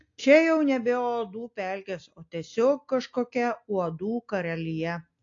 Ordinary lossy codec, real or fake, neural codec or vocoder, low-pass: AAC, 48 kbps; real; none; 7.2 kHz